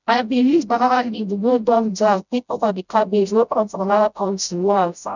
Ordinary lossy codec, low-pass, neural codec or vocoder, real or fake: none; 7.2 kHz; codec, 16 kHz, 0.5 kbps, FreqCodec, smaller model; fake